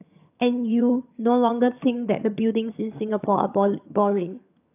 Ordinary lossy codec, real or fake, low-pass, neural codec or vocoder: AAC, 32 kbps; fake; 3.6 kHz; vocoder, 22.05 kHz, 80 mel bands, HiFi-GAN